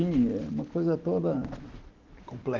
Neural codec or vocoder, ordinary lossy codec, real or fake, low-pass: none; Opus, 16 kbps; real; 7.2 kHz